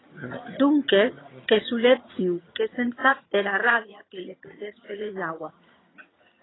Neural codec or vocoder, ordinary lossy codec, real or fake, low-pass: vocoder, 22.05 kHz, 80 mel bands, HiFi-GAN; AAC, 16 kbps; fake; 7.2 kHz